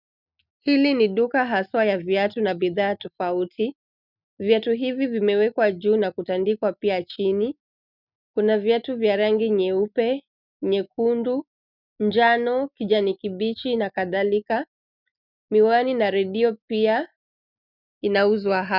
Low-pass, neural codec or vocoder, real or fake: 5.4 kHz; none; real